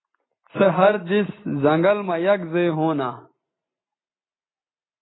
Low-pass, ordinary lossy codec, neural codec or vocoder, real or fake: 7.2 kHz; AAC, 16 kbps; none; real